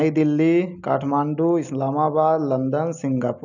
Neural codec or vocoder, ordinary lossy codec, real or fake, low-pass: none; none; real; 7.2 kHz